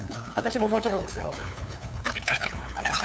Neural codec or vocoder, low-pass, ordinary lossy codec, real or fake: codec, 16 kHz, 2 kbps, FunCodec, trained on LibriTTS, 25 frames a second; none; none; fake